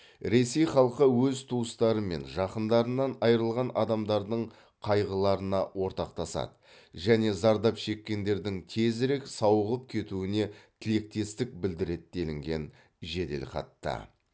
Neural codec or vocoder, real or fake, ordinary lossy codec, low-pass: none; real; none; none